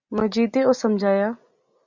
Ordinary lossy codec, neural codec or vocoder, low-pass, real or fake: Opus, 64 kbps; none; 7.2 kHz; real